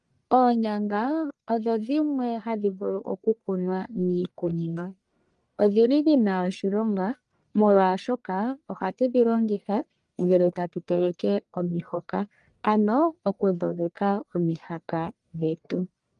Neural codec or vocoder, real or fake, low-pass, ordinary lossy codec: codec, 44.1 kHz, 1.7 kbps, Pupu-Codec; fake; 10.8 kHz; Opus, 32 kbps